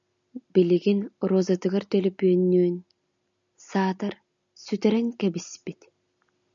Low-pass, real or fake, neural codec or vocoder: 7.2 kHz; real; none